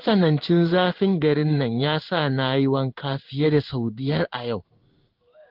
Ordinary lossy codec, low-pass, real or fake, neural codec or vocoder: Opus, 24 kbps; 5.4 kHz; fake; codec, 16 kHz in and 24 kHz out, 1 kbps, XY-Tokenizer